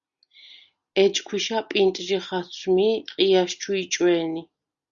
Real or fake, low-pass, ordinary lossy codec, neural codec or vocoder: real; 7.2 kHz; Opus, 64 kbps; none